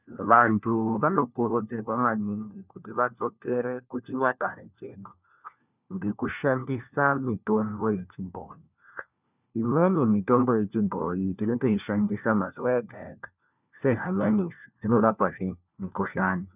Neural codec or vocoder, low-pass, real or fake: codec, 16 kHz, 1 kbps, FunCodec, trained on LibriTTS, 50 frames a second; 3.6 kHz; fake